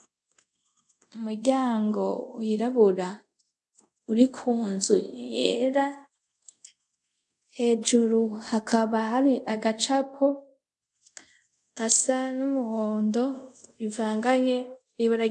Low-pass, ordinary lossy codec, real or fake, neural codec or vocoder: 10.8 kHz; AAC, 64 kbps; fake; codec, 24 kHz, 0.5 kbps, DualCodec